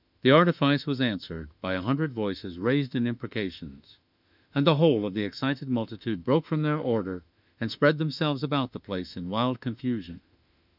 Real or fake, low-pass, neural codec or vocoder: fake; 5.4 kHz; autoencoder, 48 kHz, 32 numbers a frame, DAC-VAE, trained on Japanese speech